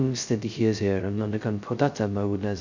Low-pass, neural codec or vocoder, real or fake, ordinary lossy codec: 7.2 kHz; codec, 16 kHz, 0.2 kbps, FocalCodec; fake; none